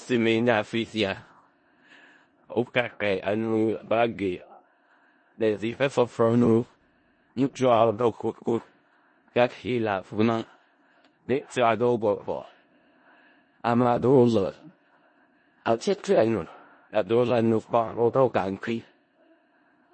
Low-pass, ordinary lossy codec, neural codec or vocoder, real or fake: 9.9 kHz; MP3, 32 kbps; codec, 16 kHz in and 24 kHz out, 0.4 kbps, LongCat-Audio-Codec, four codebook decoder; fake